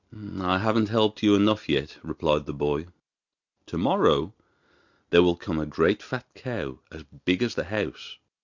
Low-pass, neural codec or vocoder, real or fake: 7.2 kHz; none; real